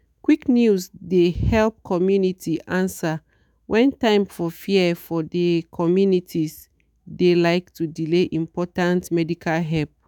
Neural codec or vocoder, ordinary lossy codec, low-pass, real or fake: autoencoder, 48 kHz, 128 numbers a frame, DAC-VAE, trained on Japanese speech; none; 19.8 kHz; fake